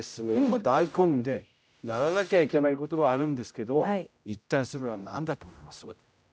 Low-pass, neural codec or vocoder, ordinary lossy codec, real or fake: none; codec, 16 kHz, 0.5 kbps, X-Codec, HuBERT features, trained on general audio; none; fake